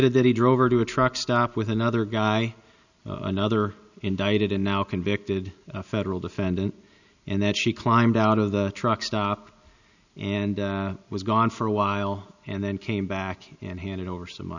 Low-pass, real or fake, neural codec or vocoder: 7.2 kHz; real; none